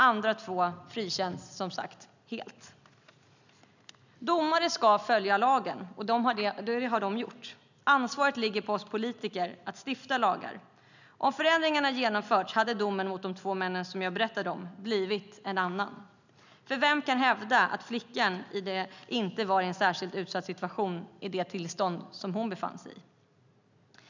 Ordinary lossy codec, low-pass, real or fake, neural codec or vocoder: none; 7.2 kHz; real; none